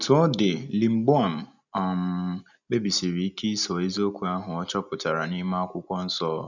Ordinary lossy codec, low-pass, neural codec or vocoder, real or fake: none; 7.2 kHz; none; real